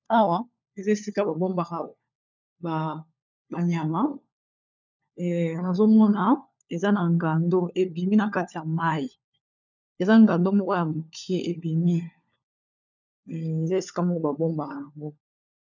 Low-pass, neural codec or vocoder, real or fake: 7.2 kHz; codec, 16 kHz, 4 kbps, FunCodec, trained on LibriTTS, 50 frames a second; fake